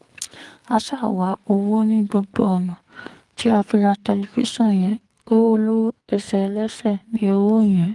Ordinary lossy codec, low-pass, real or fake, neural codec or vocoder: Opus, 24 kbps; 10.8 kHz; fake; codec, 44.1 kHz, 2.6 kbps, SNAC